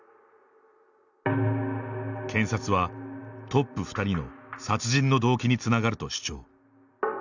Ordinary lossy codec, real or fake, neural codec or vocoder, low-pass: none; real; none; 7.2 kHz